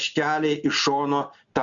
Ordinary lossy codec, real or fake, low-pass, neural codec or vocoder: Opus, 64 kbps; real; 7.2 kHz; none